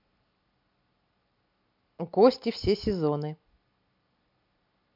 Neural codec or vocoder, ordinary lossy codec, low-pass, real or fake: none; MP3, 48 kbps; 5.4 kHz; real